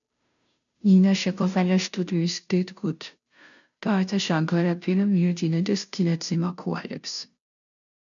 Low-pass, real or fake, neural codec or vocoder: 7.2 kHz; fake; codec, 16 kHz, 0.5 kbps, FunCodec, trained on Chinese and English, 25 frames a second